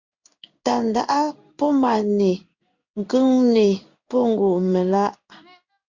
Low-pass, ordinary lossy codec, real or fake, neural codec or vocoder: 7.2 kHz; Opus, 64 kbps; fake; codec, 16 kHz in and 24 kHz out, 1 kbps, XY-Tokenizer